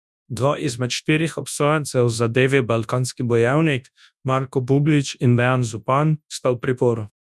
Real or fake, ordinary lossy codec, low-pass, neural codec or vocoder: fake; none; none; codec, 24 kHz, 0.9 kbps, WavTokenizer, large speech release